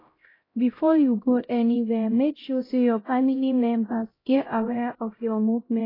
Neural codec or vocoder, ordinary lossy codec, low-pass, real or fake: codec, 16 kHz, 0.5 kbps, X-Codec, HuBERT features, trained on LibriSpeech; AAC, 24 kbps; 5.4 kHz; fake